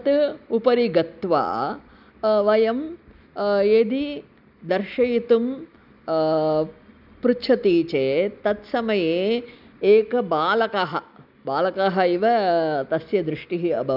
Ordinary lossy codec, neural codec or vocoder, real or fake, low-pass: none; none; real; 5.4 kHz